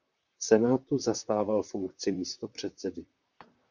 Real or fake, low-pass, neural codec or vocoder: fake; 7.2 kHz; vocoder, 44.1 kHz, 128 mel bands, Pupu-Vocoder